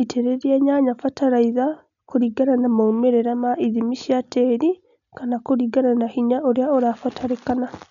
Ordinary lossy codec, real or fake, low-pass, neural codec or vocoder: none; real; 7.2 kHz; none